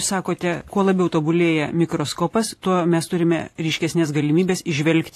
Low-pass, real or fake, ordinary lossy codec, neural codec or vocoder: 14.4 kHz; fake; AAC, 48 kbps; vocoder, 44.1 kHz, 128 mel bands every 512 samples, BigVGAN v2